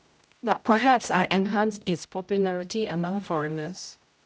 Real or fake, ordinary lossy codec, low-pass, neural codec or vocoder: fake; none; none; codec, 16 kHz, 0.5 kbps, X-Codec, HuBERT features, trained on general audio